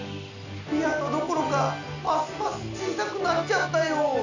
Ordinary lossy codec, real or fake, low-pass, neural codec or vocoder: none; real; 7.2 kHz; none